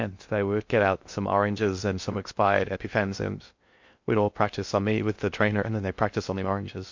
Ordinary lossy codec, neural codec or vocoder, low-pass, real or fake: MP3, 48 kbps; codec, 16 kHz in and 24 kHz out, 0.6 kbps, FocalCodec, streaming, 2048 codes; 7.2 kHz; fake